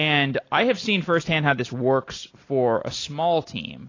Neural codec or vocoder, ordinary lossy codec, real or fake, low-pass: none; AAC, 32 kbps; real; 7.2 kHz